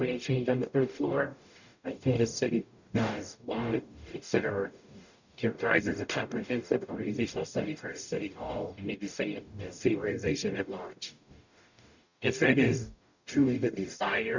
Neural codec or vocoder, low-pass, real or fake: codec, 44.1 kHz, 0.9 kbps, DAC; 7.2 kHz; fake